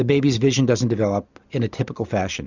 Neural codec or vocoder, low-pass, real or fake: none; 7.2 kHz; real